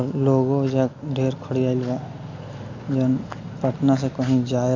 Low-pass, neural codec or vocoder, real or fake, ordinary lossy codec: 7.2 kHz; none; real; AAC, 48 kbps